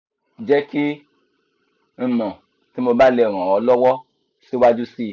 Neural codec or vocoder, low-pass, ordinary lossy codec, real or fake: none; 7.2 kHz; none; real